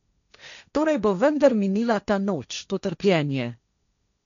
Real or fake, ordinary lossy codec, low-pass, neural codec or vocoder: fake; none; 7.2 kHz; codec, 16 kHz, 1.1 kbps, Voila-Tokenizer